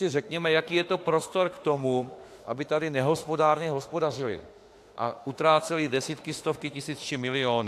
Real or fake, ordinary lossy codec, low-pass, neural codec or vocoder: fake; AAC, 64 kbps; 14.4 kHz; autoencoder, 48 kHz, 32 numbers a frame, DAC-VAE, trained on Japanese speech